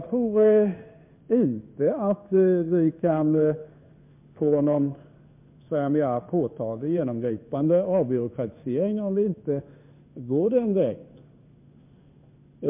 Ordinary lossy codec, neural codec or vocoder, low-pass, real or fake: MP3, 32 kbps; codec, 16 kHz in and 24 kHz out, 1 kbps, XY-Tokenizer; 3.6 kHz; fake